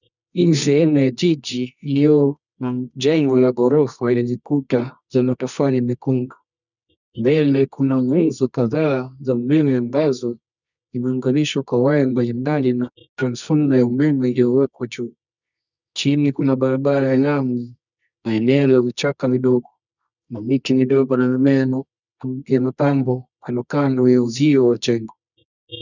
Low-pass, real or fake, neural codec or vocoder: 7.2 kHz; fake; codec, 24 kHz, 0.9 kbps, WavTokenizer, medium music audio release